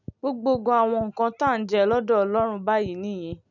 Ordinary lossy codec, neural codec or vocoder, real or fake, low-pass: none; none; real; 7.2 kHz